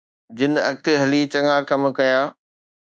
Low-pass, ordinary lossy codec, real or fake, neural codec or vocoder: 9.9 kHz; Opus, 64 kbps; fake; codec, 24 kHz, 1.2 kbps, DualCodec